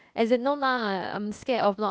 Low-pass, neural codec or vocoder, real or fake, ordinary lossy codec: none; codec, 16 kHz, 0.8 kbps, ZipCodec; fake; none